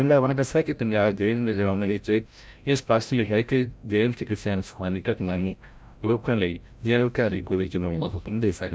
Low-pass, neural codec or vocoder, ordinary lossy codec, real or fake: none; codec, 16 kHz, 0.5 kbps, FreqCodec, larger model; none; fake